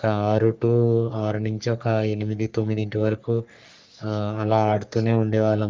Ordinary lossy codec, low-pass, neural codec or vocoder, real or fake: Opus, 24 kbps; 7.2 kHz; codec, 44.1 kHz, 3.4 kbps, Pupu-Codec; fake